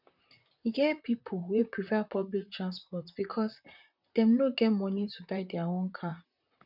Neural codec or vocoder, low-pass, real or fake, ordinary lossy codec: vocoder, 44.1 kHz, 128 mel bands, Pupu-Vocoder; 5.4 kHz; fake; none